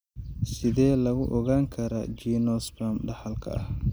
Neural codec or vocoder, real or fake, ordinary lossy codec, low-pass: none; real; none; none